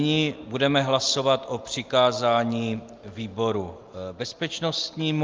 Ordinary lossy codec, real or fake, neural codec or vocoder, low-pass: Opus, 24 kbps; real; none; 7.2 kHz